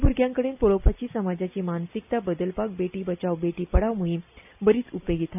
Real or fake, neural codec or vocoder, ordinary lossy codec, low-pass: real; none; none; 3.6 kHz